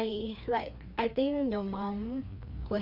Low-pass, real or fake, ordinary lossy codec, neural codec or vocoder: 5.4 kHz; fake; none; codec, 16 kHz, 2 kbps, FreqCodec, larger model